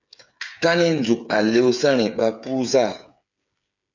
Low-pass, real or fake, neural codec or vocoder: 7.2 kHz; fake; codec, 16 kHz, 8 kbps, FreqCodec, smaller model